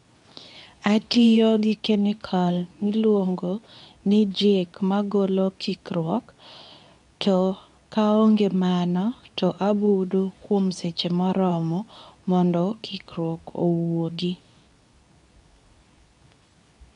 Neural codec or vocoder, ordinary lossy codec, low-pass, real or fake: codec, 24 kHz, 0.9 kbps, WavTokenizer, medium speech release version 2; none; 10.8 kHz; fake